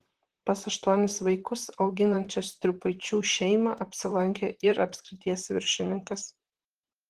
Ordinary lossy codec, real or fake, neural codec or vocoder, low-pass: Opus, 16 kbps; fake; vocoder, 44.1 kHz, 128 mel bands, Pupu-Vocoder; 14.4 kHz